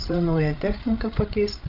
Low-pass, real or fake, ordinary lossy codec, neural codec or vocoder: 5.4 kHz; fake; Opus, 32 kbps; codec, 16 kHz, 16 kbps, FreqCodec, larger model